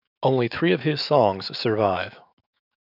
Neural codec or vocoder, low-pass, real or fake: autoencoder, 48 kHz, 128 numbers a frame, DAC-VAE, trained on Japanese speech; 5.4 kHz; fake